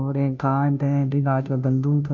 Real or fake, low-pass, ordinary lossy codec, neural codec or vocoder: fake; 7.2 kHz; none; codec, 16 kHz, 0.5 kbps, FunCodec, trained on Chinese and English, 25 frames a second